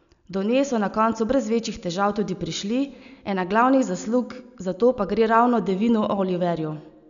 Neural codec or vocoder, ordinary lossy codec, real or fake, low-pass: none; none; real; 7.2 kHz